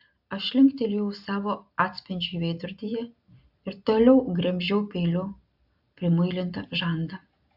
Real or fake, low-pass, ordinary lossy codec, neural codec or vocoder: real; 5.4 kHz; AAC, 48 kbps; none